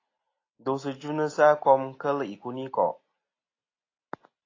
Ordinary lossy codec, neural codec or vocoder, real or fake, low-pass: AAC, 32 kbps; none; real; 7.2 kHz